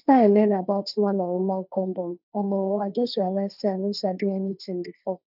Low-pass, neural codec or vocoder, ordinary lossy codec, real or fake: 5.4 kHz; codec, 16 kHz, 1.1 kbps, Voila-Tokenizer; none; fake